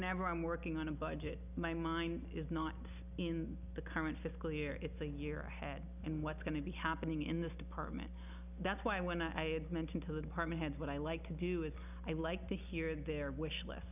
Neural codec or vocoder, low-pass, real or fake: none; 3.6 kHz; real